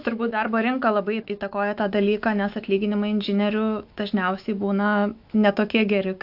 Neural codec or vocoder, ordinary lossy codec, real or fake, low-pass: autoencoder, 48 kHz, 128 numbers a frame, DAC-VAE, trained on Japanese speech; AAC, 48 kbps; fake; 5.4 kHz